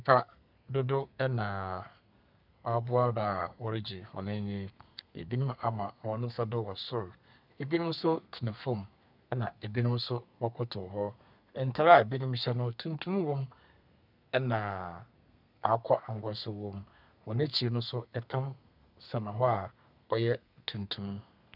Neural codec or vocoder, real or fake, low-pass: codec, 32 kHz, 1.9 kbps, SNAC; fake; 5.4 kHz